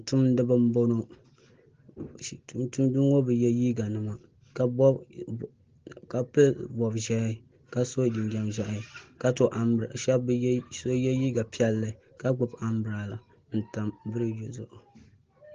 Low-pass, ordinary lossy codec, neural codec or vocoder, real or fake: 7.2 kHz; Opus, 16 kbps; none; real